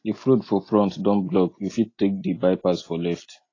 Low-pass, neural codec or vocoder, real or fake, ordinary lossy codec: 7.2 kHz; none; real; AAC, 32 kbps